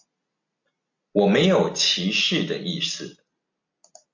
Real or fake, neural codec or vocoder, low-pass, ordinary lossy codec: real; none; 7.2 kHz; MP3, 48 kbps